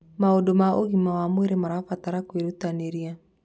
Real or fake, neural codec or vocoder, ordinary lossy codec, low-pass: real; none; none; none